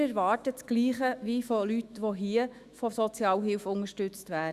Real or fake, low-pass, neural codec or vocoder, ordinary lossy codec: fake; 14.4 kHz; autoencoder, 48 kHz, 128 numbers a frame, DAC-VAE, trained on Japanese speech; Opus, 64 kbps